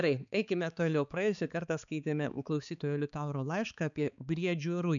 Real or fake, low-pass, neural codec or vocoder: fake; 7.2 kHz; codec, 16 kHz, 4 kbps, X-Codec, HuBERT features, trained on balanced general audio